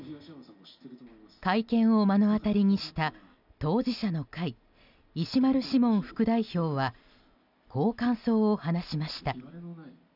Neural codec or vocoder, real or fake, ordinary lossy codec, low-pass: none; real; none; 5.4 kHz